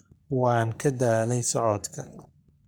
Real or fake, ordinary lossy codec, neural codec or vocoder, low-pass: fake; none; codec, 44.1 kHz, 3.4 kbps, Pupu-Codec; none